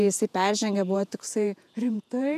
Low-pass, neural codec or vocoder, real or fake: 14.4 kHz; vocoder, 48 kHz, 128 mel bands, Vocos; fake